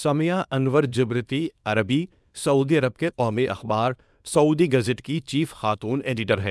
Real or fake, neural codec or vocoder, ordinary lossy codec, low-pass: fake; codec, 24 kHz, 0.9 kbps, WavTokenizer, small release; none; none